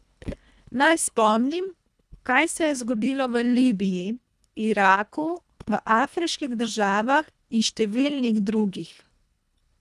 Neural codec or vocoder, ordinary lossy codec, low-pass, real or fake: codec, 24 kHz, 1.5 kbps, HILCodec; none; none; fake